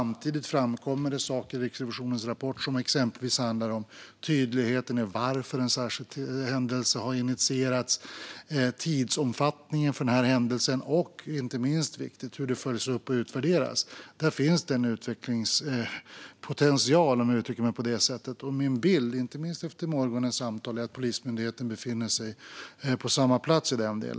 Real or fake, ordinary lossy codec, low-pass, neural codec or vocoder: real; none; none; none